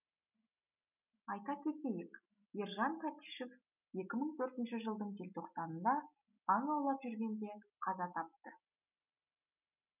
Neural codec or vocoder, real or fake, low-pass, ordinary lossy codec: none; real; 3.6 kHz; none